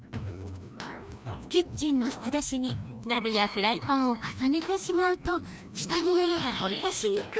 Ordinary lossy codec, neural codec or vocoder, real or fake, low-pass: none; codec, 16 kHz, 1 kbps, FreqCodec, larger model; fake; none